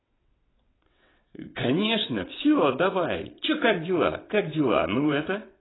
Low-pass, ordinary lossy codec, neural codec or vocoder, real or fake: 7.2 kHz; AAC, 16 kbps; vocoder, 22.05 kHz, 80 mel bands, WaveNeXt; fake